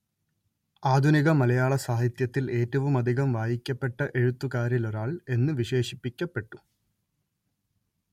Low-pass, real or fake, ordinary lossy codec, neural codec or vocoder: 19.8 kHz; real; MP3, 64 kbps; none